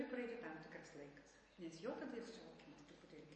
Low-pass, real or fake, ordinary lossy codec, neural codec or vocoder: 10.8 kHz; real; MP3, 32 kbps; none